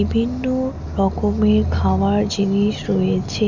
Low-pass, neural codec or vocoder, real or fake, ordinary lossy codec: 7.2 kHz; none; real; none